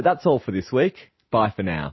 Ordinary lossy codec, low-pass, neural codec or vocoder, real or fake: MP3, 24 kbps; 7.2 kHz; none; real